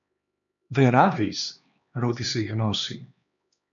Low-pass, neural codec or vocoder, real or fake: 7.2 kHz; codec, 16 kHz, 2 kbps, X-Codec, HuBERT features, trained on LibriSpeech; fake